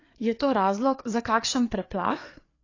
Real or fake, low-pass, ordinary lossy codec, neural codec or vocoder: fake; 7.2 kHz; AAC, 32 kbps; codec, 16 kHz, 4 kbps, FreqCodec, larger model